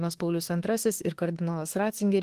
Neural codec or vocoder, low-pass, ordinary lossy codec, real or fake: autoencoder, 48 kHz, 32 numbers a frame, DAC-VAE, trained on Japanese speech; 14.4 kHz; Opus, 16 kbps; fake